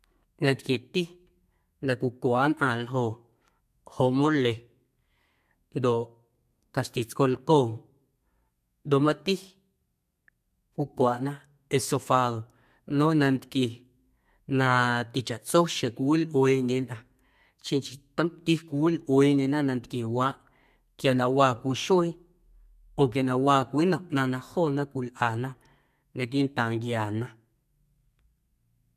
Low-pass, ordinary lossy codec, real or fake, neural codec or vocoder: 14.4 kHz; MP3, 96 kbps; fake; codec, 32 kHz, 1.9 kbps, SNAC